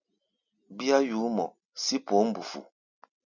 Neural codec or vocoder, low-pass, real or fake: none; 7.2 kHz; real